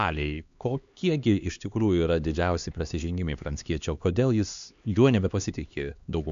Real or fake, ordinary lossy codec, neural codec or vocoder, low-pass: fake; MP3, 64 kbps; codec, 16 kHz, 2 kbps, X-Codec, HuBERT features, trained on LibriSpeech; 7.2 kHz